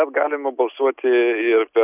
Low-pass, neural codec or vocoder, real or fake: 3.6 kHz; none; real